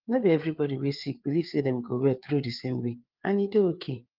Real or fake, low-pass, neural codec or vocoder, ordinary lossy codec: fake; 5.4 kHz; codec, 16 kHz, 4 kbps, FreqCodec, larger model; Opus, 32 kbps